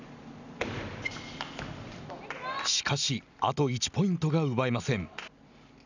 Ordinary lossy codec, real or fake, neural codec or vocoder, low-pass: none; real; none; 7.2 kHz